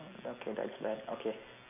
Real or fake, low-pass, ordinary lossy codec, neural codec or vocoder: real; 3.6 kHz; none; none